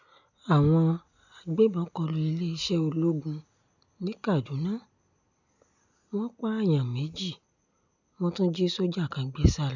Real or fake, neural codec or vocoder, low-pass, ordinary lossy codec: real; none; 7.2 kHz; none